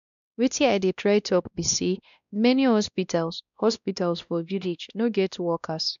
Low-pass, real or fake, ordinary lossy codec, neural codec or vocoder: 7.2 kHz; fake; none; codec, 16 kHz, 1 kbps, X-Codec, WavLM features, trained on Multilingual LibriSpeech